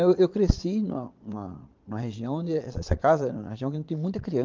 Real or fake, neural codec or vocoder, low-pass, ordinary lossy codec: fake; vocoder, 22.05 kHz, 80 mel bands, Vocos; 7.2 kHz; Opus, 24 kbps